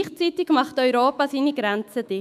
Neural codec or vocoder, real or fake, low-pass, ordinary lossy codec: autoencoder, 48 kHz, 128 numbers a frame, DAC-VAE, trained on Japanese speech; fake; 14.4 kHz; none